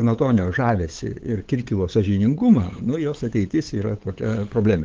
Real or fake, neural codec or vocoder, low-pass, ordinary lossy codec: fake; codec, 16 kHz, 8 kbps, FunCodec, trained on LibriTTS, 25 frames a second; 7.2 kHz; Opus, 16 kbps